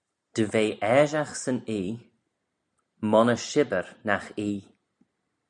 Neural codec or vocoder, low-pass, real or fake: none; 9.9 kHz; real